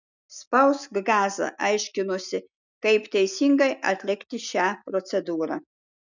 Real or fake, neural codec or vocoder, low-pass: real; none; 7.2 kHz